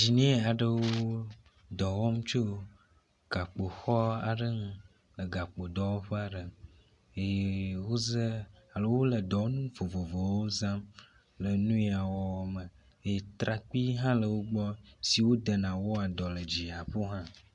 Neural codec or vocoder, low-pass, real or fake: none; 10.8 kHz; real